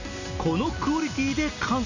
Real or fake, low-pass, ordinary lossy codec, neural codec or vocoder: real; 7.2 kHz; none; none